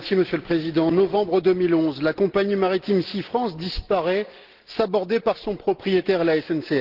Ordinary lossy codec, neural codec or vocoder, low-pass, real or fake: Opus, 16 kbps; none; 5.4 kHz; real